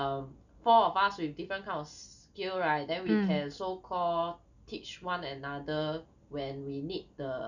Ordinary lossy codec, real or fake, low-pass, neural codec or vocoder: none; real; 7.2 kHz; none